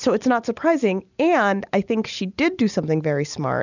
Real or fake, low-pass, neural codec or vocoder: real; 7.2 kHz; none